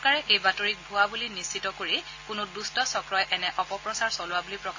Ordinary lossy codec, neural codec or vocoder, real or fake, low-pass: AAC, 48 kbps; none; real; 7.2 kHz